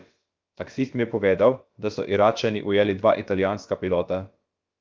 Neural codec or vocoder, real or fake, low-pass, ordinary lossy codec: codec, 16 kHz, about 1 kbps, DyCAST, with the encoder's durations; fake; 7.2 kHz; Opus, 24 kbps